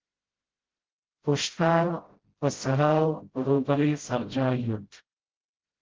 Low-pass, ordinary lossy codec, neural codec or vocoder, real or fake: 7.2 kHz; Opus, 16 kbps; codec, 16 kHz, 0.5 kbps, FreqCodec, smaller model; fake